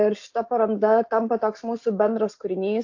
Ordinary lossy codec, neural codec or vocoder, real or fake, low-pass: AAC, 48 kbps; none; real; 7.2 kHz